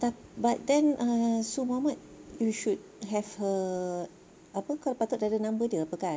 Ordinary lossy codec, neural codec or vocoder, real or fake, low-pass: none; none; real; none